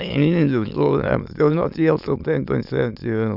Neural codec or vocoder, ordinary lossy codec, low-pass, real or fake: autoencoder, 22.05 kHz, a latent of 192 numbers a frame, VITS, trained on many speakers; none; 5.4 kHz; fake